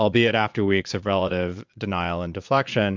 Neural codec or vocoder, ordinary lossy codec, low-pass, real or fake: vocoder, 22.05 kHz, 80 mel bands, Vocos; MP3, 64 kbps; 7.2 kHz; fake